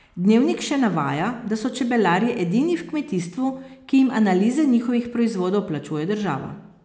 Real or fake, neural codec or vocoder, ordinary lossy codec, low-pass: real; none; none; none